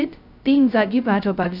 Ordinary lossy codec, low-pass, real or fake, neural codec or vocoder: none; 5.4 kHz; fake; codec, 16 kHz, 0.2 kbps, FocalCodec